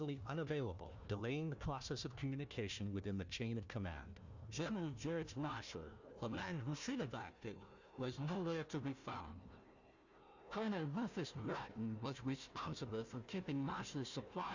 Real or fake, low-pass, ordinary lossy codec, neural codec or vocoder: fake; 7.2 kHz; Opus, 64 kbps; codec, 16 kHz, 1 kbps, FunCodec, trained on Chinese and English, 50 frames a second